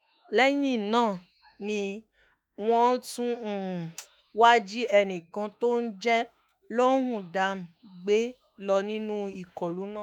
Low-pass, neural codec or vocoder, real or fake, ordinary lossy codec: 19.8 kHz; autoencoder, 48 kHz, 32 numbers a frame, DAC-VAE, trained on Japanese speech; fake; none